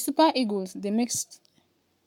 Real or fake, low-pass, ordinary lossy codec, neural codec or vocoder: fake; 19.8 kHz; MP3, 96 kbps; codec, 44.1 kHz, 7.8 kbps, Pupu-Codec